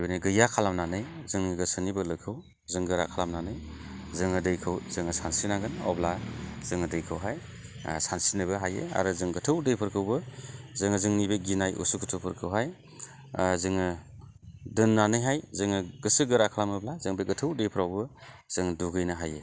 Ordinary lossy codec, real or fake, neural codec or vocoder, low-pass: none; real; none; none